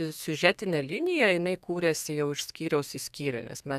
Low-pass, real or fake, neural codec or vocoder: 14.4 kHz; fake; codec, 32 kHz, 1.9 kbps, SNAC